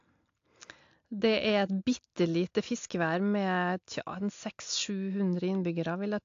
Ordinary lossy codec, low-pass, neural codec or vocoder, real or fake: AAC, 48 kbps; 7.2 kHz; none; real